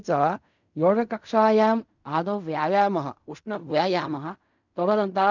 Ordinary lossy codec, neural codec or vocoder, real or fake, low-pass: none; codec, 16 kHz in and 24 kHz out, 0.4 kbps, LongCat-Audio-Codec, fine tuned four codebook decoder; fake; 7.2 kHz